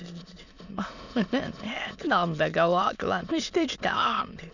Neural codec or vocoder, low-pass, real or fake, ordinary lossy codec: autoencoder, 22.05 kHz, a latent of 192 numbers a frame, VITS, trained on many speakers; 7.2 kHz; fake; none